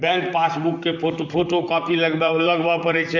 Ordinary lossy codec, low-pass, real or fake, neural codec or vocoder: MP3, 64 kbps; 7.2 kHz; fake; vocoder, 44.1 kHz, 128 mel bands, Pupu-Vocoder